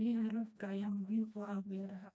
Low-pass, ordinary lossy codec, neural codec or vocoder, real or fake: none; none; codec, 16 kHz, 1 kbps, FreqCodec, smaller model; fake